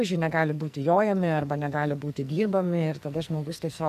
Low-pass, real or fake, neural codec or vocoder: 14.4 kHz; fake; codec, 44.1 kHz, 2.6 kbps, SNAC